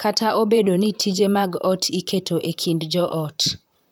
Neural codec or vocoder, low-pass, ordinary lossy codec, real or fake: vocoder, 44.1 kHz, 128 mel bands, Pupu-Vocoder; none; none; fake